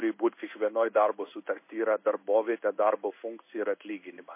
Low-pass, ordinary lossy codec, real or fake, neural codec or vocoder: 3.6 kHz; MP3, 24 kbps; fake; codec, 16 kHz in and 24 kHz out, 1 kbps, XY-Tokenizer